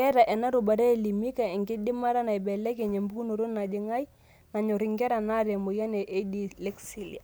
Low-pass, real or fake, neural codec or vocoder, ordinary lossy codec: none; real; none; none